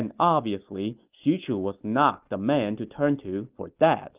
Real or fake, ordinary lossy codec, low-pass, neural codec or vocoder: real; Opus, 24 kbps; 3.6 kHz; none